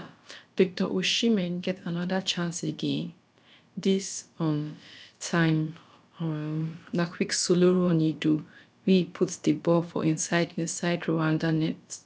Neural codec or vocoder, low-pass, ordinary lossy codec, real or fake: codec, 16 kHz, about 1 kbps, DyCAST, with the encoder's durations; none; none; fake